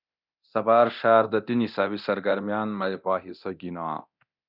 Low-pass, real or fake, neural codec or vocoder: 5.4 kHz; fake; codec, 24 kHz, 0.9 kbps, DualCodec